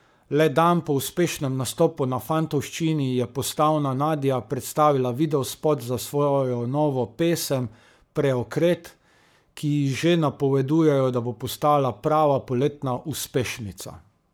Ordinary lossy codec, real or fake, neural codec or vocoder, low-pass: none; fake; codec, 44.1 kHz, 7.8 kbps, Pupu-Codec; none